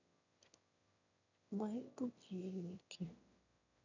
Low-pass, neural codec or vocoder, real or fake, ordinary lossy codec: 7.2 kHz; autoencoder, 22.05 kHz, a latent of 192 numbers a frame, VITS, trained on one speaker; fake; none